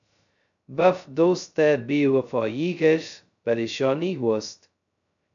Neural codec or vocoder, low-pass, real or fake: codec, 16 kHz, 0.2 kbps, FocalCodec; 7.2 kHz; fake